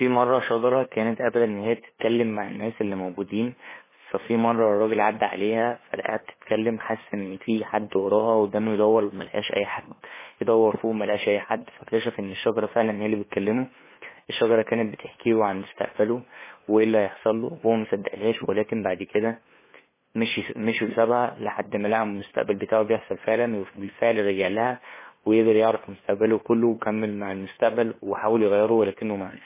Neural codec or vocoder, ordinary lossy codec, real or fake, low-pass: codec, 16 kHz, 2 kbps, FunCodec, trained on LibriTTS, 25 frames a second; MP3, 16 kbps; fake; 3.6 kHz